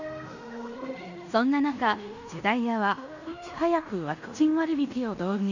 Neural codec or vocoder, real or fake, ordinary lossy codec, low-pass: codec, 16 kHz in and 24 kHz out, 0.9 kbps, LongCat-Audio-Codec, fine tuned four codebook decoder; fake; none; 7.2 kHz